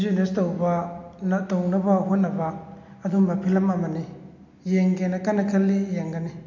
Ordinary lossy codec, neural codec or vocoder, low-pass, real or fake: MP3, 48 kbps; none; 7.2 kHz; real